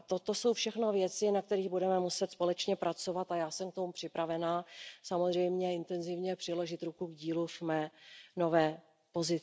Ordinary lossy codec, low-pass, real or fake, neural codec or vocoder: none; none; real; none